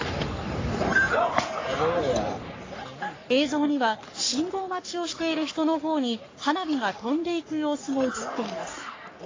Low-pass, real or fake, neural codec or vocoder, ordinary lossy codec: 7.2 kHz; fake; codec, 44.1 kHz, 3.4 kbps, Pupu-Codec; AAC, 32 kbps